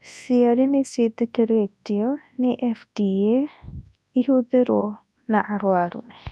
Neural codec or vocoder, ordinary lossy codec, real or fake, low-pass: codec, 24 kHz, 0.9 kbps, WavTokenizer, large speech release; none; fake; none